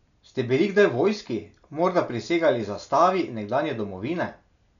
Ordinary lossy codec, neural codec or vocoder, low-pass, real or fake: none; none; 7.2 kHz; real